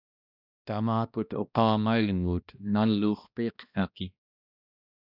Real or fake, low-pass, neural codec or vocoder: fake; 5.4 kHz; codec, 16 kHz, 1 kbps, X-Codec, HuBERT features, trained on balanced general audio